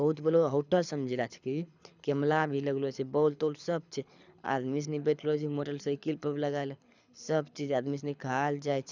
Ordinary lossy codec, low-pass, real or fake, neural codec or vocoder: none; 7.2 kHz; fake; codec, 24 kHz, 6 kbps, HILCodec